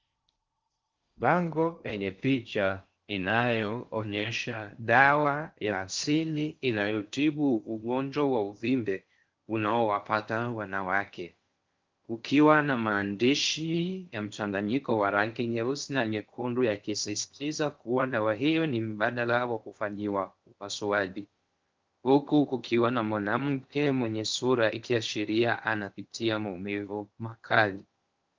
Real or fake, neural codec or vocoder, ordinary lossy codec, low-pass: fake; codec, 16 kHz in and 24 kHz out, 0.6 kbps, FocalCodec, streaming, 4096 codes; Opus, 32 kbps; 7.2 kHz